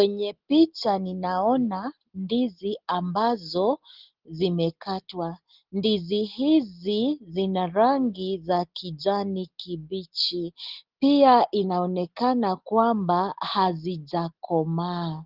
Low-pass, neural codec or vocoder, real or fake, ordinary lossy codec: 5.4 kHz; none; real; Opus, 16 kbps